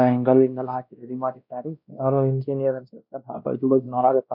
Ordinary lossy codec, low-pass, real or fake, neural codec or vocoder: none; 5.4 kHz; fake; codec, 16 kHz, 1 kbps, X-Codec, WavLM features, trained on Multilingual LibriSpeech